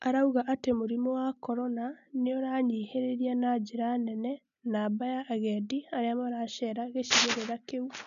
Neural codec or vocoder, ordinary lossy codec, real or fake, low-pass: none; none; real; 7.2 kHz